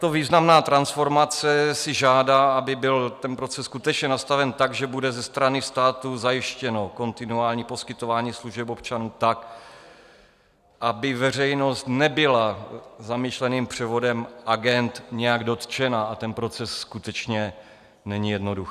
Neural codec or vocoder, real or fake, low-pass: none; real; 14.4 kHz